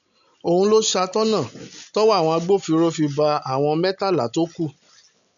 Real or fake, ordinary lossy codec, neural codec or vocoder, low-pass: real; none; none; 7.2 kHz